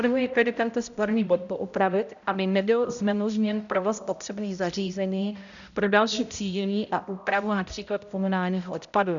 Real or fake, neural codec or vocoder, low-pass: fake; codec, 16 kHz, 0.5 kbps, X-Codec, HuBERT features, trained on balanced general audio; 7.2 kHz